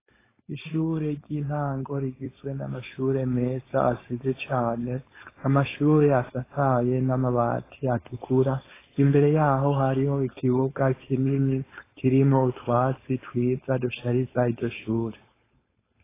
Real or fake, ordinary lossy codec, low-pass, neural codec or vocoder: fake; AAC, 16 kbps; 3.6 kHz; codec, 16 kHz, 4.8 kbps, FACodec